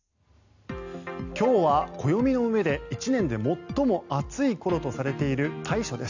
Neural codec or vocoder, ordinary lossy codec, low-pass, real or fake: none; none; 7.2 kHz; real